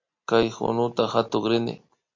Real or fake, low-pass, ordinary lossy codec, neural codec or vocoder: real; 7.2 kHz; MP3, 48 kbps; none